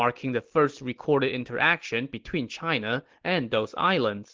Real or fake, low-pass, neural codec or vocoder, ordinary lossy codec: real; 7.2 kHz; none; Opus, 16 kbps